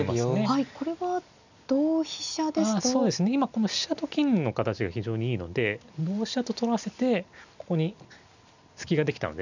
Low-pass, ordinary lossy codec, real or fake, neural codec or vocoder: 7.2 kHz; none; real; none